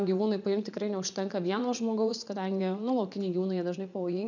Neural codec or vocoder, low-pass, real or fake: vocoder, 44.1 kHz, 80 mel bands, Vocos; 7.2 kHz; fake